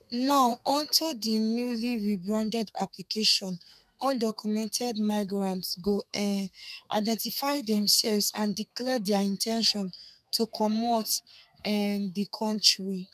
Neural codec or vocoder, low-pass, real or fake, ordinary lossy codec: codec, 32 kHz, 1.9 kbps, SNAC; 14.4 kHz; fake; none